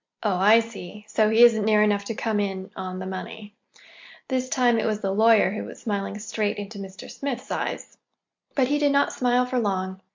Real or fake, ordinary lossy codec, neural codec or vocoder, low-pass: real; MP3, 64 kbps; none; 7.2 kHz